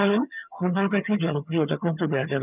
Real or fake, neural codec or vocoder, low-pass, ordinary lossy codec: fake; vocoder, 22.05 kHz, 80 mel bands, HiFi-GAN; 3.6 kHz; none